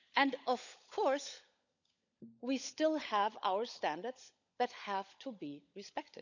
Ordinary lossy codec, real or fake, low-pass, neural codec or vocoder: none; fake; 7.2 kHz; codec, 16 kHz, 16 kbps, FunCodec, trained on LibriTTS, 50 frames a second